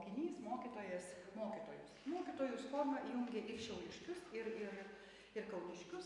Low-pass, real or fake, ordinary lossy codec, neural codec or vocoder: 10.8 kHz; real; MP3, 64 kbps; none